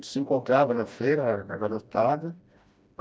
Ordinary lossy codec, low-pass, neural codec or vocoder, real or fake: none; none; codec, 16 kHz, 1 kbps, FreqCodec, smaller model; fake